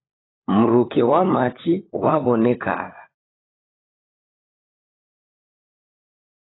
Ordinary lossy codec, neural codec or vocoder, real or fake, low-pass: AAC, 16 kbps; codec, 16 kHz, 16 kbps, FunCodec, trained on LibriTTS, 50 frames a second; fake; 7.2 kHz